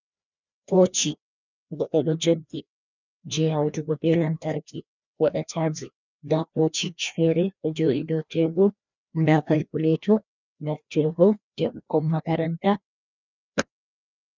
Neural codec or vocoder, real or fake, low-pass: codec, 16 kHz, 1 kbps, FreqCodec, larger model; fake; 7.2 kHz